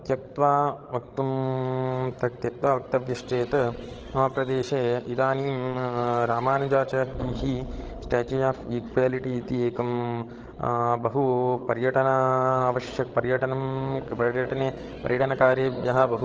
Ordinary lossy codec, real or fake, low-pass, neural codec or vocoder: Opus, 16 kbps; fake; 7.2 kHz; codec, 16 kHz, 16 kbps, FreqCodec, larger model